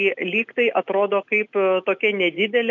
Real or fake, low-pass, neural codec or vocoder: real; 7.2 kHz; none